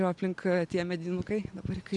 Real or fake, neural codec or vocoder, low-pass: real; none; 10.8 kHz